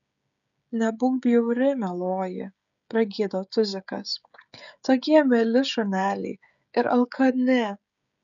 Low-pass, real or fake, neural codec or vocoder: 7.2 kHz; fake; codec, 16 kHz, 16 kbps, FreqCodec, smaller model